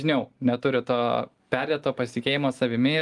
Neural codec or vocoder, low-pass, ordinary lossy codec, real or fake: none; 10.8 kHz; Opus, 24 kbps; real